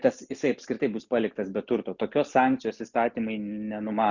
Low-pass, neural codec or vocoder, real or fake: 7.2 kHz; none; real